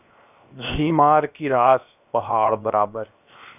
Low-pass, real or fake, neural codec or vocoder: 3.6 kHz; fake; codec, 16 kHz, 0.7 kbps, FocalCodec